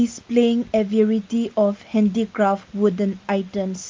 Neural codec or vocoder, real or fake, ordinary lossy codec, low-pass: none; real; Opus, 32 kbps; 7.2 kHz